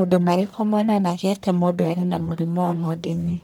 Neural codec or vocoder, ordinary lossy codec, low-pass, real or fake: codec, 44.1 kHz, 1.7 kbps, Pupu-Codec; none; none; fake